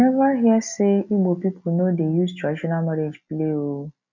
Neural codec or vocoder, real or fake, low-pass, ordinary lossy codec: none; real; 7.2 kHz; none